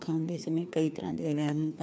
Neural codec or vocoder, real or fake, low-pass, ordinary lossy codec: codec, 16 kHz, 2 kbps, FreqCodec, larger model; fake; none; none